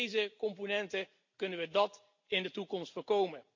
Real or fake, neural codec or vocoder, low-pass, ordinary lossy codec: real; none; 7.2 kHz; MP3, 48 kbps